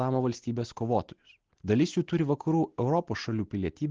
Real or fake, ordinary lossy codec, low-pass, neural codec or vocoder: real; Opus, 16 kbps; 7.2 kHz; none